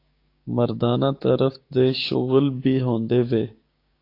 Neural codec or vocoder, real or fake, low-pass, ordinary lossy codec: codec, 16 kHz, 6 kbps, DAC; fake; 5.4 kHz; AAC, 32 kbps